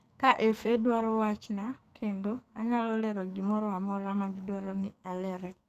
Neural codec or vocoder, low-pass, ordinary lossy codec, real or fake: codec, 44.1 kHz, 2.6 kbps, SNAC; 14.4 kHz; none; fake